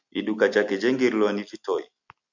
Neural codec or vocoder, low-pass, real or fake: none; 7.2 kHz; real